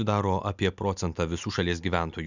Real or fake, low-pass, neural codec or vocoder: real; 7.2 kHz; none